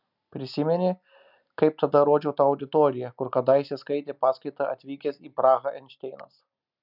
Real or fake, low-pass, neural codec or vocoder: real; 5.4 kHz; none